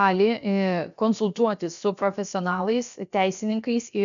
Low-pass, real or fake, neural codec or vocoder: 7.2 kHz; fake; codec, 16 kHz, about 1 kbps, DyCAST, with the encoder's durations